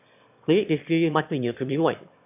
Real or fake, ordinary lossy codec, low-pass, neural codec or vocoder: fake; AAC, 32 kbps; 3.6 kHz; autoencoder, 22.05 kHz, a latent of 192 numbers a frame, VITS, trained on one speaker